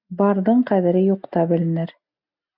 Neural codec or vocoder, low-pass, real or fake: none; 5.4 kHz; real